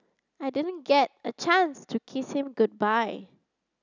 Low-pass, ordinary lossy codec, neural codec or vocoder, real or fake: 7.2 kHz; none; none; real